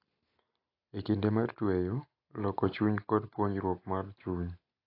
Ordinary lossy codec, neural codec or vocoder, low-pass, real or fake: AAC, 32 kbps; none; 5.4 kHz; real